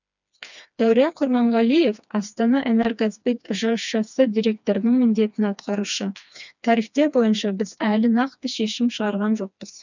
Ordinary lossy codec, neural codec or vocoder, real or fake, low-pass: none; codec, 16 kHz, 2 kbps, FreqCodec, smaller model; fake; 7.2 kHz